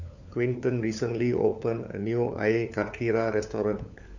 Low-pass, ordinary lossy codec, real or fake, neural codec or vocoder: 7.2 kHz; none; fake; codec, 16 kHz, 4 kbps, FunCodec, trained on LibriTTS, 50 frames a second